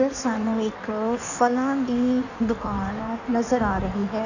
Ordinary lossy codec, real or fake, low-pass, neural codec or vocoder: none; fake; 7.2 kHz; codec, 16 kHz in and 24 kHz out, 1.1 kbps, FireRedTTS-2 codec